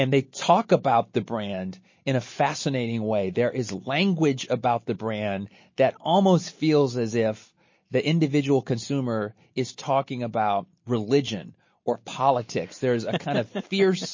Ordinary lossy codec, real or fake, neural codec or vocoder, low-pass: MP3, 32 kbps; real; none; 7.2 kHz